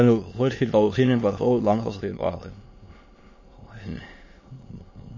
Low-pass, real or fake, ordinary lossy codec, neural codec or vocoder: 7.2 kHz; fake; MP3, 32 kbps; autoencoder, 22.05 kHz, a latent of 192 numbers a frame, VITS, trained on many speakers